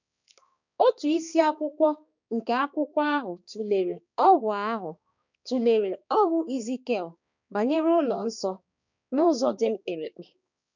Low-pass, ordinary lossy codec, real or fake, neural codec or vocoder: 7.2 kHz; none; fake; codec, 16 kHz, 2 kbps, X-Codec, HuBERT features, trained on balanced general audio